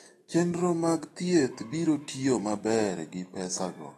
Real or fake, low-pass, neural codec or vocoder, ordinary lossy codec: fake; 19.8 kHz; autoencoder, 48 kHz, 128 numbers a frame, DAC-VAE, trained on Japanese speech; AAC, 32 kbps